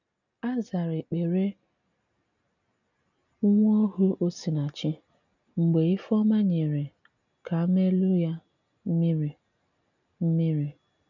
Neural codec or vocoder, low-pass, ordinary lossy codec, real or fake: none; 7.2 kHz; none; real